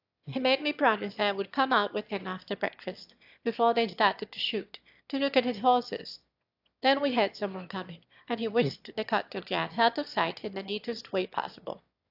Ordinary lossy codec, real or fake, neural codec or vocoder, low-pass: AAC, 48 kbps; fake; autoencoder, 22.05 kHz, a latent of 192 numbers a frame, VITS, trained on one speaker; 5.4 kHz